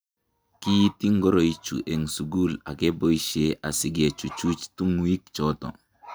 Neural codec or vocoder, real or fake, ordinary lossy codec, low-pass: none; real; none; none